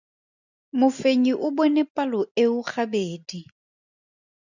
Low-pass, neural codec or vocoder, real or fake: 7.2 kHz; none; real